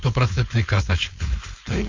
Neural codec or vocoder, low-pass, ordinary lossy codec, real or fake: codec, 16 kHz, 4.8 kbps, FACodec; 7.2 kHz; MP3, 48 kbps; fake